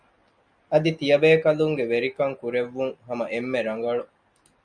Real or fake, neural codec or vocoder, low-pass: real; none; 9.9 kHz